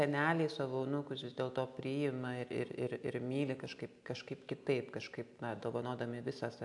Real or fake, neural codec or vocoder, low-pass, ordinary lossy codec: real; none; 10.8 kHz; AAC, 64 kbps